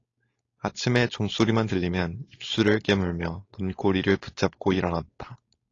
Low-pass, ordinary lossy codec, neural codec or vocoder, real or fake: 7.2 kHz; AAC, 32 kbps; codec, 16 kHz, 4.8 kbps, FACodec; fake